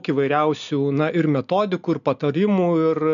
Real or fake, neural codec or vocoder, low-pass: real; none; 7.2 kHz